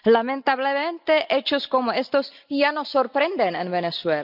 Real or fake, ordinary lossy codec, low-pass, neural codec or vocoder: real; none; 5.4 kHz; none